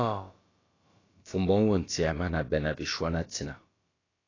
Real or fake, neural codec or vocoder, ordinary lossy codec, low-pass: fake; codec, 16 kHz, about 1 kbps, DyCAST, with the encoder's durations; AAC, 32 kbps; 7.2 kHz